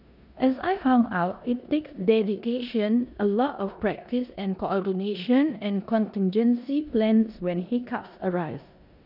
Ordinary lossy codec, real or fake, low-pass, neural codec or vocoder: none; fake; 5.4 kHz; codec, 16 kHz in and 24 kHz out, 0.9 kbps, LongCat-Audio-Codec, four codebook decoder